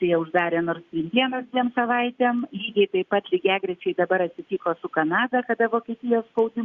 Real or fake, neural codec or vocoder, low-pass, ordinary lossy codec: real; none; 7.2 kHz; Opus, 64 kbps